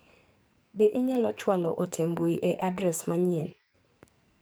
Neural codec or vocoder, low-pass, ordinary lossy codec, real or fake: codec, 44.1 kHz, 2.6 kbps, SNAC; none; none; fake